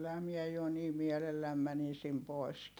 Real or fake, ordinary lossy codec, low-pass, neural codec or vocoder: real; none; none; none